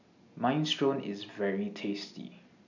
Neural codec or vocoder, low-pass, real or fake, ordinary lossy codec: none; 7.2 kHz; real; none